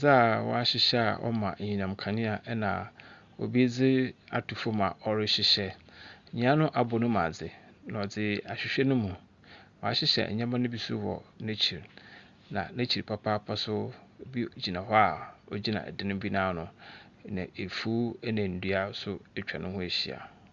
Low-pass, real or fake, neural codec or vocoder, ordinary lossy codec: 7.2 kHz; real; none; Opus, 64 kbps